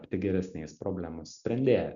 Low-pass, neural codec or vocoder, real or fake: 7.2 kHz; none; real